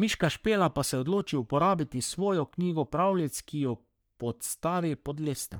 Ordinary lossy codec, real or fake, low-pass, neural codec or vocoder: none; fake; none; codec, 44.1 kHz, 3.4 kbps, Pupu-Codec